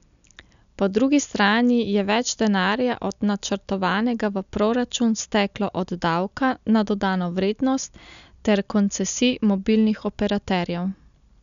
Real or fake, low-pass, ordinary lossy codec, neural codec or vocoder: real; 7.2 kHz; none; none